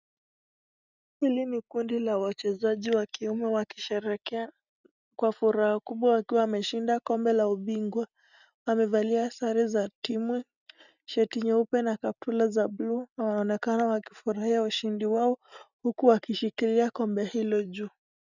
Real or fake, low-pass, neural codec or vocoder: real; 7.2 kHz; none